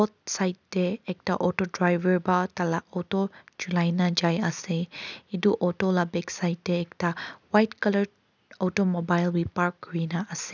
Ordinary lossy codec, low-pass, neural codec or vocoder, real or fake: none; 7.2 kHz; none; real